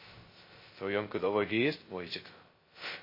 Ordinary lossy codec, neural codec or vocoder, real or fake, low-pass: MP3, 24 kbps; codec, 16 kHz, 0.2 kbps, FocalCodec; fake; 5.4 kHz